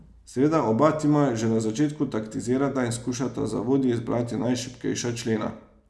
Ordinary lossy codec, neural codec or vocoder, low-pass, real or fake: none; none; none; real